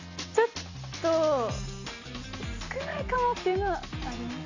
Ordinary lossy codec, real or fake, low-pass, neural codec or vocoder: AAC, 48 kbps; real; 7.2 kHz; none